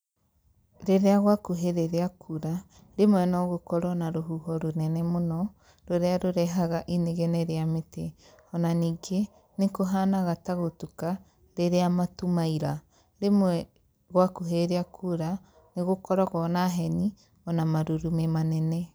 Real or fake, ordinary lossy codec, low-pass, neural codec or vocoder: real; none; none; none